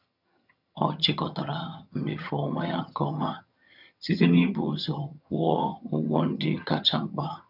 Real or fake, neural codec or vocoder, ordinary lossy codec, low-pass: fake; vocoder, 22.05 kHz, 80 mel bands, HiFi-GAN; none; 5.4 kHz